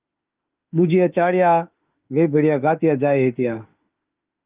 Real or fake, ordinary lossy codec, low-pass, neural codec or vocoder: fake; Opus, 24 kbps; 3.6 kHz; autoencoder, 48 kHz, 32 numbers a frame, DAC-VAE, trained on Japanese speech